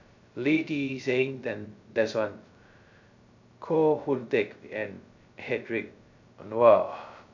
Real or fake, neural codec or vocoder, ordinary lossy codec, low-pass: fake; codec, 16 kHz, 0.2 kbps, FocalCodec; none; 7.2 kHz